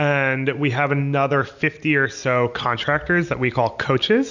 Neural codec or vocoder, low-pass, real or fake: none; 7.2 kHz; real